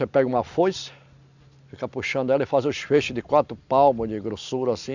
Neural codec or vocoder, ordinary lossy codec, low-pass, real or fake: none; none; 7.2 kHz; real